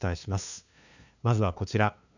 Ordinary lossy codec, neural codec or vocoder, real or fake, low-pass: none; codec, 16 kHz, 2 kbps, FunCodec, trained on LibriTTS, 25 frames a second; fake; 7.2 kHz